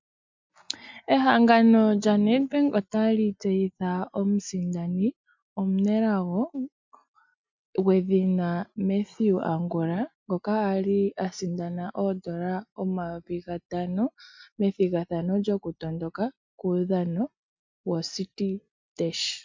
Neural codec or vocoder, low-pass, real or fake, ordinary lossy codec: none; 7.2 kHz; real; MP3, 64 kbps